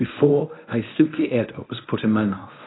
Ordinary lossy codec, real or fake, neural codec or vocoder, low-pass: AAC, 16 kbps; fake; codec, 24 kHz, 0.9 kbps, WavTokenizer, medium speech release version 1; 7.2 kHz